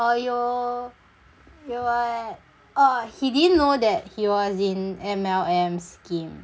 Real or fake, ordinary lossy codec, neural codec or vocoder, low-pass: real; none; none; none